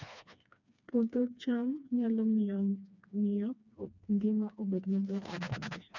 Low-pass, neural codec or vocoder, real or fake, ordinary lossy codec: 7.2 kHz; codec, 16 kHz, 2 kbps, FreqCodec, smaller model; fake; none